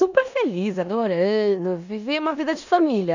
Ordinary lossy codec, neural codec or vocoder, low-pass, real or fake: none; codec, 16 kHz in and 24 kHz out, 0.9 kbps, LongCat-Audio-Codec, four codebook decoder; 7.2 kHz; fake